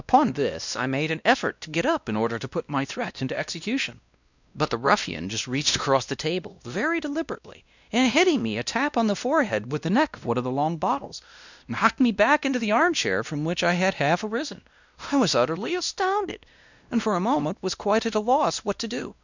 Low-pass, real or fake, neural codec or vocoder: 7.2 kHz; fake; codec, 16 kHz, 1 kbps, X-Codec, WavLM features, trained on Multilingual LibriSpeech